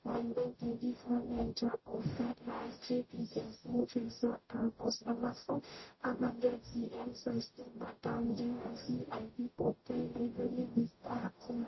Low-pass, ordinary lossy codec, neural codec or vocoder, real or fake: 7.2 kHz; MP3, 24 kbps; codec, 44.1 kHz, 0.9 kbps, DAC; fake